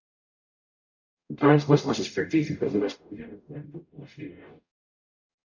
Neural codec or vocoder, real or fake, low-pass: codec, 44.1 kHz, 0.9 kbps, DAC; fake; 7.2 kHz